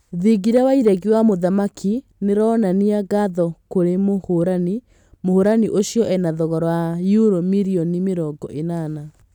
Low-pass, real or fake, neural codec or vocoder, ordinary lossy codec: 19.8 kHz; real; none; none